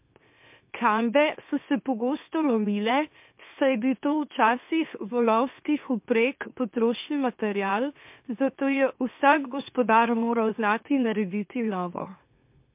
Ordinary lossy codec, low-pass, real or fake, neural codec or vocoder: MP3, 32 kbps; 3.6 kHz; fake; autoencoder, 44.1 kHz, a latent of 192 numbers a frame, MeloTTS